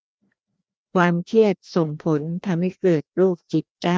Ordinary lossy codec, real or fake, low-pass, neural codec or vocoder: none; fake; none; codec, 16 kHz, 1 kbps, FreqCodec, larger model